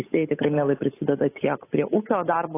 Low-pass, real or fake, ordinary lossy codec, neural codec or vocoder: 3.6 kHz; fake; AAC, 16 kbps; codec, 16 kHz, 8 kbps, FunCodec, trained on Chinese and English, 25 frames a second